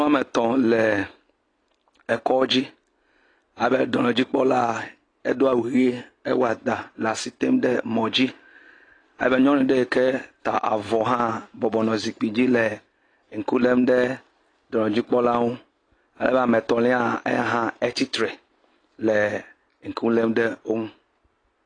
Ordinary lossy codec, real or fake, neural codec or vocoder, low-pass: AAC, 32 kbps; real; none; 9.9 kHz